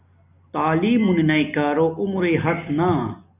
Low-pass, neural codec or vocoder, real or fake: 3.6 kHz; none; real